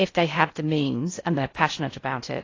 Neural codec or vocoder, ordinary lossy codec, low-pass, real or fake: codec, 16 kHz in and 24 kHz out, 0.6 kbps, FocalCodec, streaming, 4096 codes; AAC, 32 kbps; 7.2 kHz; fake